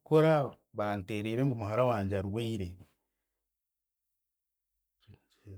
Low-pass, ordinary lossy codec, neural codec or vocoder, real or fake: none; none; codec, 44.1 kHz, 7.8 kbps, Pupu-Codec; fake